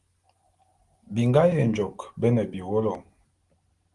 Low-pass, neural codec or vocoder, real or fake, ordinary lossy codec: 10.8 kHz; none; real; Opus, 24 kbps